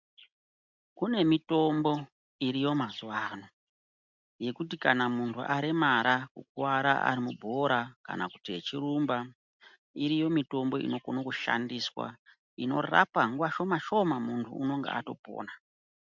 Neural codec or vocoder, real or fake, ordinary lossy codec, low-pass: none; real; MP3, 64 kbps; 7.2 kHz